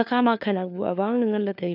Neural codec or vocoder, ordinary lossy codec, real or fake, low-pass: codec, 16 kHz, 4 kbps, FunCodec, trained on LibriTTS, 50 frames a second; none; fake; 5.4 kHz